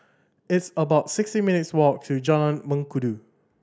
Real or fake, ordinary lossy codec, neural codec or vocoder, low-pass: real; none; none; none